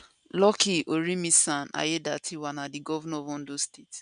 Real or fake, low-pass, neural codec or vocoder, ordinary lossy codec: real; 9.9 kHz; none; none